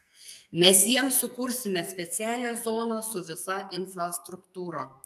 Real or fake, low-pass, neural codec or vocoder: fake; 14.4 kHz; codec, 44.1 kHz, 2.6 kbps, SNAC